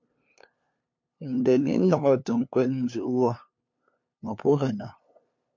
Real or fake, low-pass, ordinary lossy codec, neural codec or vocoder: fake; 7.2 kHz; MP3, 48 kbps; codec, 16 kHz, 2 kbps, FunCodec, trained on LibriTTS, 25 frames a second